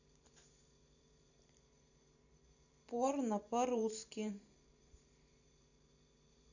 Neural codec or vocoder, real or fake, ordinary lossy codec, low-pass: vocoder, 44.1 kHz, 128 mel bands every 512 samples, BigVGAN v2; fake; none; 7.2 kHz